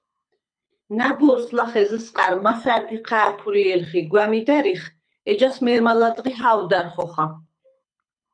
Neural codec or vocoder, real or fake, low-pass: codec, 24 kHz, 6 kbps, HILCodec; fake; 9.9 kHz